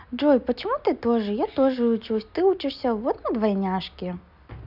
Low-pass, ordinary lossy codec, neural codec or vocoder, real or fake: 5.4 kHz; none; none; real